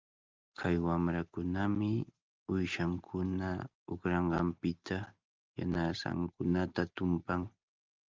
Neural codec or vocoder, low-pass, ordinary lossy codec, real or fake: none; 7.2 kHz; Opus, 32 kbps; real